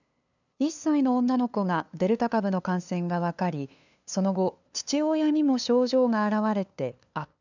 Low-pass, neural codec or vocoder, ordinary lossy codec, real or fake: 7.2 kHz; codec, 16 kHz, 2 kbps, FunCodec, trained on LibriTTS, 25 frames a second; none; fake